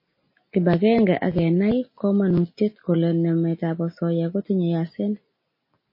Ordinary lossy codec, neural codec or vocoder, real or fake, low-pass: MP3, 24 kbps; none; real; 5.4 kHz